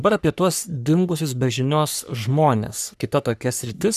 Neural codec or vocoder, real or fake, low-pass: codec, 44.1 kHz, 3.4 kbps, Pupu-Codec; fake; 14.4 kHz